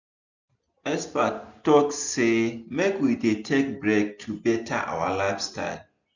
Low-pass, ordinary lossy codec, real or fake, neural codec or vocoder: 7.2 kHz; none; real; none